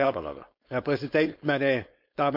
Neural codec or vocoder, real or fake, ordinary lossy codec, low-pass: codec, 16 kHz, 4.8 kbps, FACodec; fake; MP3, 48 kbps; 5.4 kHz